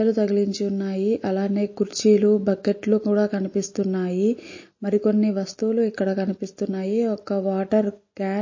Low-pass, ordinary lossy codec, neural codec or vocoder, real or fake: 7.2 kHz; MP3, 32 kbps; none; real